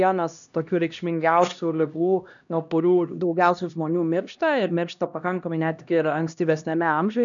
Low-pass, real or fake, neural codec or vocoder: 7.2 kHz; fake; codec, 16 kHz, 1 kbps, X-Codec, HuBERT features, trained on LibriSpeech